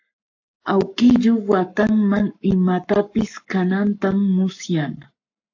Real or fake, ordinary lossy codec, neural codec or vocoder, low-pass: fake; AAC, 48 kbps; codec, 44.1 kHz, 7.8 kbps, Pupu-Codec; 7.2 kHz